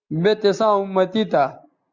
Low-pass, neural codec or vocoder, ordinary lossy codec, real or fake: 7.2 kHz; none; Opus, 64 kbps; real